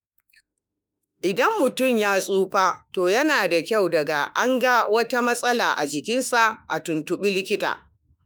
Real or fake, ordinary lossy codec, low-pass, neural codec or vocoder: fake; none; none; autoencoder, 48 kHz, 32 numbers a frame, DAC-VAE, trained on Japanese speech